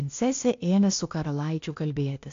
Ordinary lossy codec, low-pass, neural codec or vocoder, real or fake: AAC, 48 kbps; 7.2 kHz; codec, 16 kHz, 0.8 kbps, ZipCodec; fake